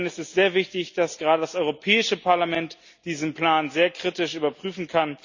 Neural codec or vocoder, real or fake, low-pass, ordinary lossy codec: none; real; 7.2 kHz; Opus, 64 kbps